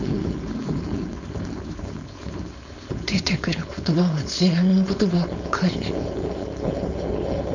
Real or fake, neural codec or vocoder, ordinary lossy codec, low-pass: fake; codec, 16 kHz, 4.8 kbps, FACodec; none; 7.2 kHz